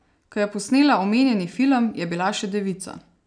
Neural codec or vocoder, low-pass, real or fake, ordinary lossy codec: none; 9.9 kHz; real; none